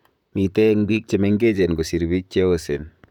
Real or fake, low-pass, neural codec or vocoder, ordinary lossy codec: fake; 19.8 kHz; vocoder, 44.1 kHz, 128 mel bands, Pupu-Vocoder; none